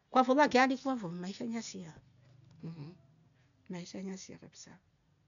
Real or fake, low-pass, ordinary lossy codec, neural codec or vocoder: real; 7.2 kHz; none; none